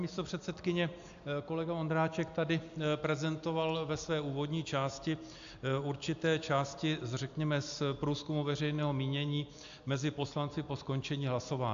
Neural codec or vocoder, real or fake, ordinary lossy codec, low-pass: none; real; AAC, 64 kbps; 7.2 kHz